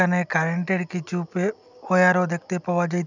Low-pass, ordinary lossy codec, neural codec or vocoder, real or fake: 7.2 kHz; none; none; real